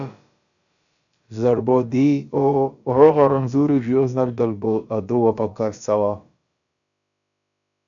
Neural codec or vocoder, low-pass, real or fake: codec, 16 kHz, about 1 kbps, DyCAST, with the encoder's durations; 7.2 kHz; fake